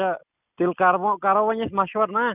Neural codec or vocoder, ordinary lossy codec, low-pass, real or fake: none; none; 3.6 kHz; real